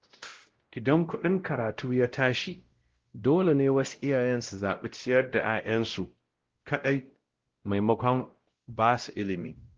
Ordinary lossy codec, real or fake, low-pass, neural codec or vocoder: Opus, 16 kbps; fake; 7.2 kHz; codec, 16 kHz, 0.5 kbps, X-Codec, WavLM features, trained on Multilingual LibriSpeech